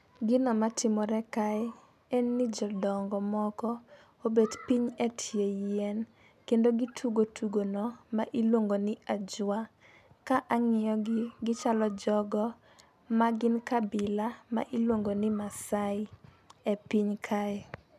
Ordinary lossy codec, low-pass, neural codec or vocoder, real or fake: none; 14.4 kHz; none; real